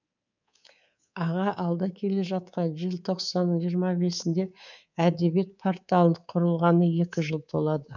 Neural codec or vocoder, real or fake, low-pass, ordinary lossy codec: codec, 24 kHz, 3.1 kbps, DualCodec; fake; 7.2 kHz; none